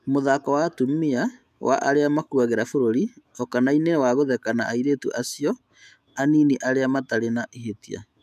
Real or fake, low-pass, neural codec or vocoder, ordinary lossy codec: fake; 14.4 kHz; autoencoder, 48 kHz, 128 numbers a frame, DAC-VAE, trained on Japanese speech; none